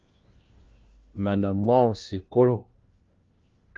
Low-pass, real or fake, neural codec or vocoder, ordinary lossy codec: 7.2 kHz; fake; codec, 16 kHz, 1 kbps, FunCodec, trained on LibriTTS, 50 frames a second; Opus, 32 kbps